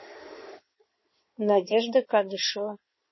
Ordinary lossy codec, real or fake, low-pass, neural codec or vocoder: MP3, 24 kbps; fake; 7.2 kHz; codec, 16 kHz in and 24 kHz out, 2.2 kbps, FireRedTTS-2 codec